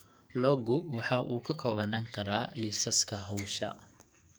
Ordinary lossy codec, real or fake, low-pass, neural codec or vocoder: none; fake; none; codec, 44.1 kHz, 2.6 kbps, SNAC